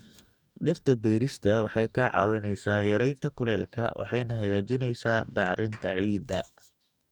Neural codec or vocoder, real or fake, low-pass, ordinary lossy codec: codec, 44.1 kHz, 2.6 kbps, DAC; fake; 19.8 kHz; none